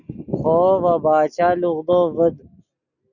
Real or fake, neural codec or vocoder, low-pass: real; none; 7.2 kHz